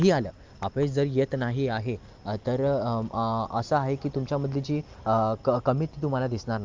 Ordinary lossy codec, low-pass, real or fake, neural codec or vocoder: Opus, 24 kbps; 7.2 kHz; real; none